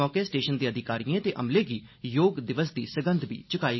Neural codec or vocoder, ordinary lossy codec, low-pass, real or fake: none; MP3, 24 kbps; 7.2 kHz; real